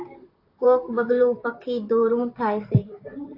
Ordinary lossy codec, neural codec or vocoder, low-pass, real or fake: AAC, 32 kbps; vocoder, 44.1 kHz, 128 mel bands, Pupu-Vocoder; 5.4 kHz; fake